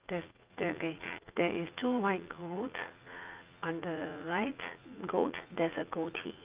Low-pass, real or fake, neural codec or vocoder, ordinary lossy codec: 3.6 kHz; fake; vocoder, 44.1 kHz, 80 mel bands, Vocos; Opus, 24 kbps